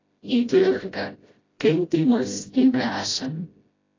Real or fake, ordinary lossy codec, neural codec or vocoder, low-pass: fake; AAC, 32 kbps; codec, 16 kHz, 0.5 kbps, FreqCodec, smaller model; 7.2 kHz